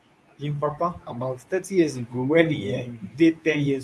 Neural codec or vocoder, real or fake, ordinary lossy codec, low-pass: codec, 24 kHz, 0.9 kbps, WavTokenizer, medium speech release version 2; fake; none; none